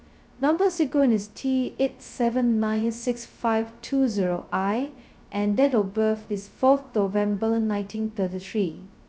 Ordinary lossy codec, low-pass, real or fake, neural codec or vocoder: none; none; fake; codec, 16 kHz, 0.2 kbps, FocalCodec